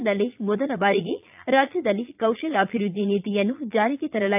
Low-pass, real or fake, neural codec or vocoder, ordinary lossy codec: 3.6 kHz; fake; vocoder, 22.05 kHz, 80 mel bands, Vocos; AAC, 32 kbps